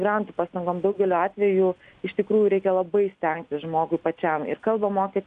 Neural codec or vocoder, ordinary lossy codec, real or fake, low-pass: none; AAC, 96 kbps; real; 9.9 kHz